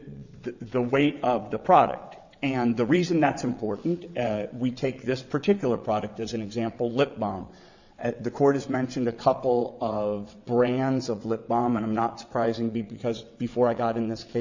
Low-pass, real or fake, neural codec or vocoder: 7.2 kHz; fake; vocoder, 22.05 kHz, 80 mel bands, WaveNeXt